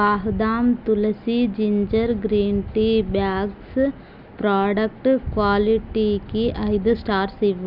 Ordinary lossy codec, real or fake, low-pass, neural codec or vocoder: none; real; 5.4 kHz; none